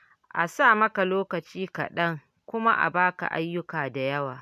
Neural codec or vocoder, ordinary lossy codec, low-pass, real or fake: none; none; 14.4 kHz; real